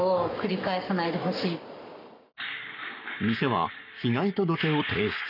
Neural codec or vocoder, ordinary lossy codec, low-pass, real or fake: codec, 44.1 kHz, 7.8 kbps, Pupu-Codec; none; 5.4 kHz; fake